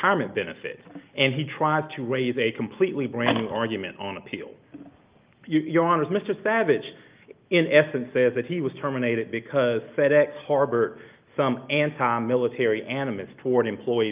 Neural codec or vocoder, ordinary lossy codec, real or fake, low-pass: none; Opus, 32 kbps; real; 3.6 kHz